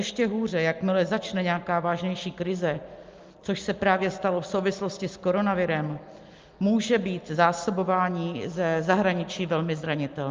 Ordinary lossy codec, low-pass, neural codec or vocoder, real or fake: Opus, 32 kbps; 7.2 kHz; none; real